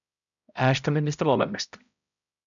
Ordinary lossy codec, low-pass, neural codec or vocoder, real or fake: MP3, 96 kbps; 7.2 kHz; codec, 16 kHz, 0.5 kbps, X-Codec, HuBERT features, trained on balanced general audio; fake